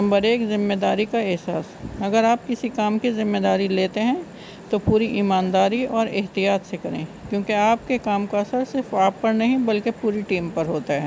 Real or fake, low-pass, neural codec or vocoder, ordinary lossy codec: real; none; none; none